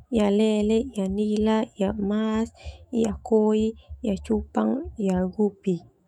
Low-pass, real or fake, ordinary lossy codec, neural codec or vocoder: 19.8 kHz; fake; none; autoencoder, 48 kHz, 128 numbers a frame, DAC-VAE, trained on Japanese speech